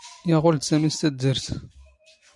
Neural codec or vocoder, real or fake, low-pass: none; real; 10.8 kHz